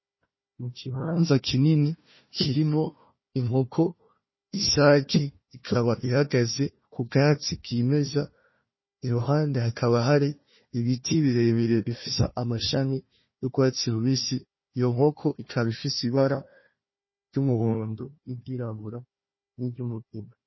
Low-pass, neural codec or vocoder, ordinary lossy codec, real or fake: 7.2 kHz; codec, 16 kHz, 1 kbps, FunCodec, trained on Chinese and English, 50 frames a second; MP3, 24 kbps; fake